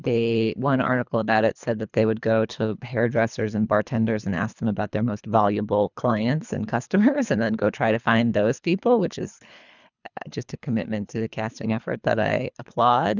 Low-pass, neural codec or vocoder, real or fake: 7.2 kHz; codec, 24 kHz, 3 kbps, HILCodec; fake